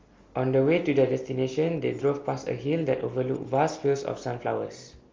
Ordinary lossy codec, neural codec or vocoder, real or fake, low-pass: Opus, 32 kbps; none; real; 7.2 kHz